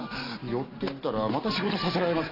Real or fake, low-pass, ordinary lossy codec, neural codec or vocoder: real; 5.4 kHz; Opus, 64 kbps; none